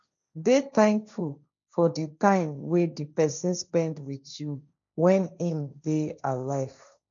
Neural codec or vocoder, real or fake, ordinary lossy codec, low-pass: codec, 16 kHz, 1.1 kbps, Voila-Tokenizer; fake; none; 7.2 kHz